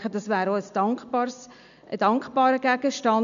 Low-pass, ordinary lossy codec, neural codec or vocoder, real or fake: 7.2 kHz; none; none; real